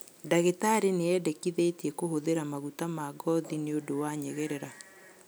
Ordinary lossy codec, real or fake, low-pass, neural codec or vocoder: none; real; none; none